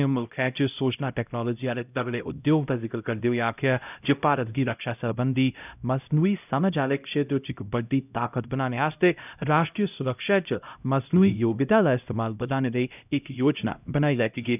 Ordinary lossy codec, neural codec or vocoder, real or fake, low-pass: none; codec, 16 kHz, 0.5 kbps, X-Codec, HuBERT features, trained on LibriSpeech; fake; 3.6 kHz